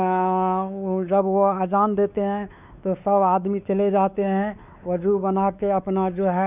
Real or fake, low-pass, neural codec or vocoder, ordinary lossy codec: fake; 3.6 kHz; codec, 16 kHz, 4 kbps, X-Codec, WavLM features, trained on Multilingual LibriSpeech; none